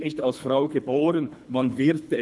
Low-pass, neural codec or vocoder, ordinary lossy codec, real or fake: none; codec, 24 kHz, 3 kbps, HILCodec; none; fake